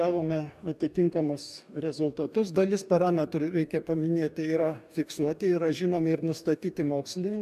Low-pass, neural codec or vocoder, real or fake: 14.4 kHz; codec, 44.1 kHz, 2.6 kbps, DAC; fake